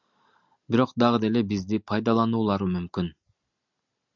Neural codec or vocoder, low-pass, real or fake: none; 7.2 kHz; real